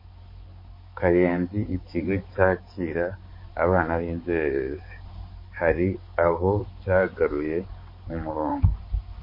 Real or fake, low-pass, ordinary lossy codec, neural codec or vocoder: fake; 5.4 kHz; MP3, 24 kbps; codec, 16 kHz, 4 kbps, X-Codec, HuBERT features, trained on balanced general audio